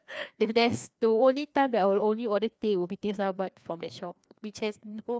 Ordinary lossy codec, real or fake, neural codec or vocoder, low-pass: none; fake; codec, 16 kHz, 2 kbps, FreqCodec, larger model; none